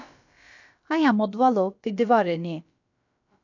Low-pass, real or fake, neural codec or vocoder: 7.2 kHz; fake; codec, 16 kHz, about 1 kbps, DyCAST, with the encoder's durations